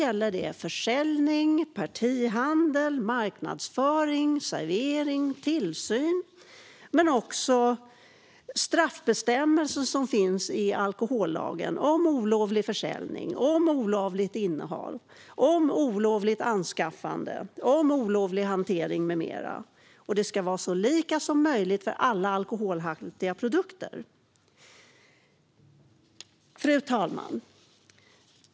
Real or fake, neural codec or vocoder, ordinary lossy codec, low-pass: real; none; none; none